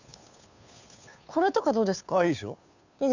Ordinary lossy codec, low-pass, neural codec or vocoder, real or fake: none; 7.2 kHz; codec, 16 kHz, 2 kbps, FunCodec, trained on Chinese and English, 25 frames a second; fake